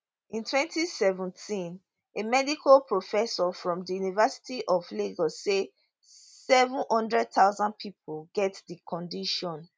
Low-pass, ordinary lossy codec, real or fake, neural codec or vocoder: none; none; real; none